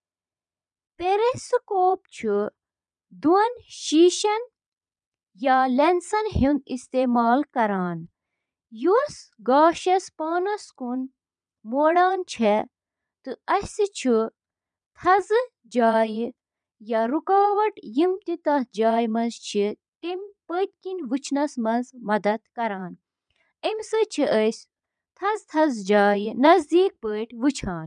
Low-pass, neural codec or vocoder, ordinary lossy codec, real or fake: 9.9 kHz; vocoder, 22.05 kHz, 80 mel bands, Vocos; none; fake